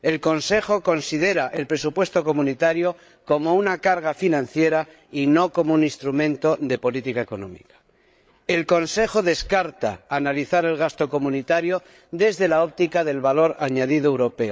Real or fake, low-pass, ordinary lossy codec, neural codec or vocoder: fake; none; none; codec, 16 kHz, 8 kbps, FreqCodec, larger model